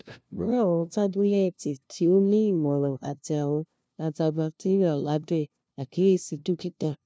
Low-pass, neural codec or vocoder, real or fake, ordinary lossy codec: none; codec, 16 kHz, 0.5 kbps, FunCodec, trained on LibriTTS, 25 frames a second; fake; none